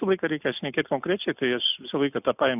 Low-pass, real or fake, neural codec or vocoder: 3.6 kHz; real; none